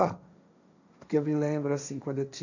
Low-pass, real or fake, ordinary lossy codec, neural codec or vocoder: 7.2 kHz; fake; none; codec, 16 kHz, 1.1 kbps, Voila-Tokenizer